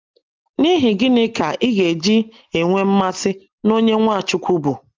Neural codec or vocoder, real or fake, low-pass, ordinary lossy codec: none; real; 7.2 kHz; Opus, 32 kbps